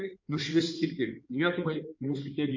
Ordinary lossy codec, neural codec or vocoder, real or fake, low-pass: MP3, 48 kbps; codec, 16 kHz in and 24 kHz out, 2.2 kbps, FireRedTTS-2 codec; fake; 7.2 kHz